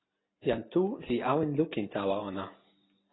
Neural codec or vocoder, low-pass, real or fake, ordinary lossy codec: none; 7.2 kHz; real; AAC, 16 kbps